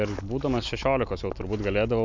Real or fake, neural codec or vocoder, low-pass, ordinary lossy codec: real; none; 7.2 kHz; MP3, 64 kbps